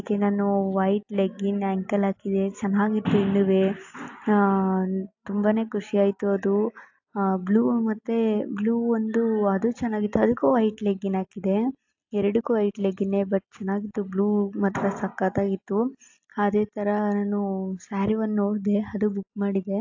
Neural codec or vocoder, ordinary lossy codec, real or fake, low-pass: none; AAC, 48 kbps; real; 7.2 kHz